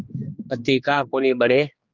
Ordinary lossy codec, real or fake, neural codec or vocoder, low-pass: Opus, 32 kbps; fake; codec, 16 kHz, 2 kbps, X-Codec, HuBERT features, trained on general audio; 7.2 kHz